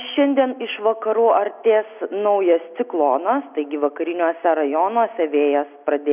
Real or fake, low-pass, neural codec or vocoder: real; 3.6 kHz; none